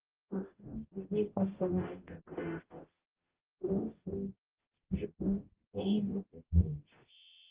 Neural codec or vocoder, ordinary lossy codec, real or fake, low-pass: codec, 44.1 kHz, 0.9 kbps, DAC; Opus, 16 kbps; fake; 3.6 kHz